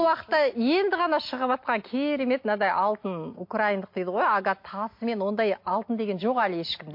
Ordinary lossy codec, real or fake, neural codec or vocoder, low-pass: MP3, 32 kbps; real; none; 5.4 kHz